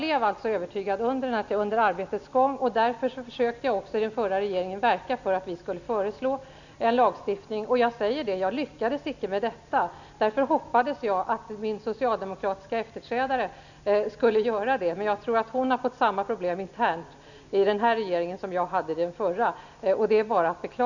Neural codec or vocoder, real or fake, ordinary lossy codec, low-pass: none; real; none; 7.2 kHz